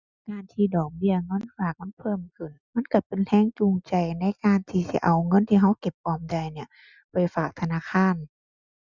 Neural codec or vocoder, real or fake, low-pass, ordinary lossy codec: none; real; 7.2 kHz; Opus, 64 kbps